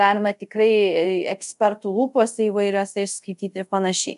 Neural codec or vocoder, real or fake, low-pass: codec, 24 kHz, 0.5 kbps, DualCodec; fake; 10.8 kHz